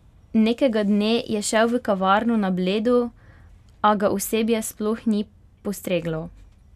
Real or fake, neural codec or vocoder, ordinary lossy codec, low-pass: real; none; none; 14.4 kHz